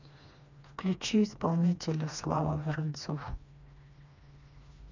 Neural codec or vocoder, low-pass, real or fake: codec, 16 kHz, 2 kbps, FreqCodec, smaller model; 7.2 kHz; fake